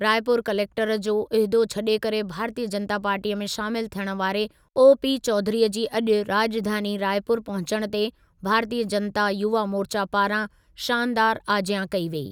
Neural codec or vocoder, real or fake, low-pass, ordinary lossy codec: none; real; 19.8 kHz; none